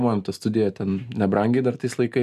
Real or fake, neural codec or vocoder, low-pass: real; none; 14.4 kHz